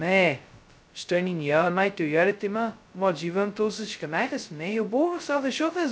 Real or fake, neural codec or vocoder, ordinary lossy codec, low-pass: fake; codec, 16 kHz, 0.2 kbps, FocalCodec; none; none